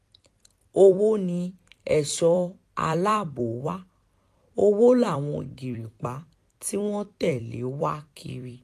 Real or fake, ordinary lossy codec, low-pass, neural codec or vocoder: fake; AAC, 64 kbps; 14.4 kHz; vocoder, 44.1 kHz, 128 mel bands every 256 samples, BigVGAN v2